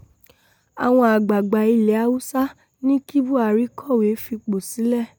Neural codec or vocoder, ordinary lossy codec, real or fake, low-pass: none; none; real; none